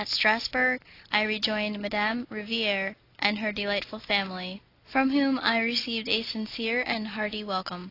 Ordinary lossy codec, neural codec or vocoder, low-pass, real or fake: AAC, 32 kbps; none; 5.4 kHz; real